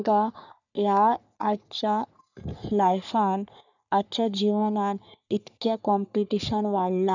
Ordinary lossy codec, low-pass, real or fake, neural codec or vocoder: none; 7.2 kHz; fake; codec, 44.1 kHz, 3.4 kbps, Pupu-Codec